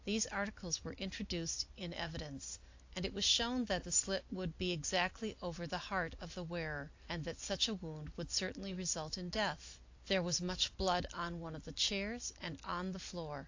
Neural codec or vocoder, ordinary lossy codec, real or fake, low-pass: none; AAC, 48 kbps; real; 7.2 kHz